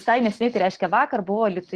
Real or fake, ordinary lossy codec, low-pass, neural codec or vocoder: real; Opus, 16 kbps; 10.8 kHz; none